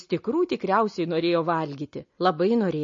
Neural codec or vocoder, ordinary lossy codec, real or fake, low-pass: none; MP3, 32 kbps; real; 7.2 kHz